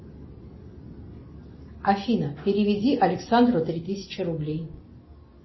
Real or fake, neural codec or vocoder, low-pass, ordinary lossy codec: real; none; 7.2 kHz; MP3, 24 kbps